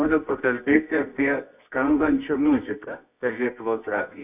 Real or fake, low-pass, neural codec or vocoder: fake; 3.6 kHz; codec, 24 kHz, 0.9 kbps, WavTokenizer, medium music audio release